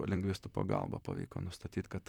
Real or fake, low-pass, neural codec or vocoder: fake; 19.8 kHz; vocoder, 44.1 kHz, 128 mel bands, Pupu-Vocoder